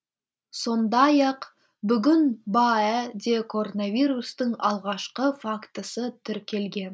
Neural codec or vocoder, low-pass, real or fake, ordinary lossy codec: none; none; real; none